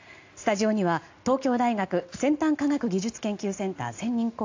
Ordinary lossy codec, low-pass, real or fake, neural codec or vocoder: AAC, 48 kbps; 7.2 kHz; real; none